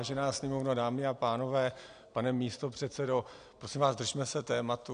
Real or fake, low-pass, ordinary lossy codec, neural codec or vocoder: real; 9.9 kHz; AAC, 64 kbps; none